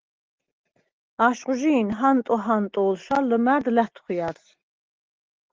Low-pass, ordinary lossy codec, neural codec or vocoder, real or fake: 7.2 kHz; Opus, 16 kbps; none; real